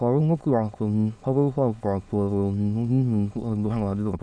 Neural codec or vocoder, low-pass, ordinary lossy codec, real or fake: autoencoder, 22.05 kHz, a latent of 192 numbers a frame, VITS, trained on many speakers; none; none; fake